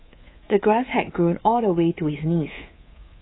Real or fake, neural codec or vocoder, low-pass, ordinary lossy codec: fake; codec, 24 kHz, 3.1 kbps, DualCodec; 7.2 kHz; AAC, 16 kbps